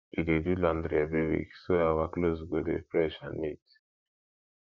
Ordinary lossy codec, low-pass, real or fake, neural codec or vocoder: none; 7.2 kHz; fake; vocoder, 44.1 kHz, 128 mel bands, Pupu-Vocoder